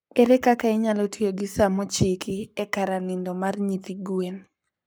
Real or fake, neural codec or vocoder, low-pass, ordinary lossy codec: fake; codec, 44.1 kHz, 7.8 kbps, Pupu-Codec; none; none